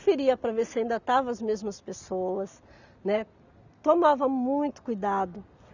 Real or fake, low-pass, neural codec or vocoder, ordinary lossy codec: real; 7.2 kHz; none; none